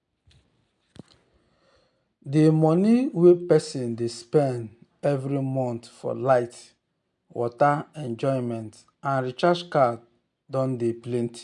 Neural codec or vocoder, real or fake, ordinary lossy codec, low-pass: none; real; none; 10.8 kHz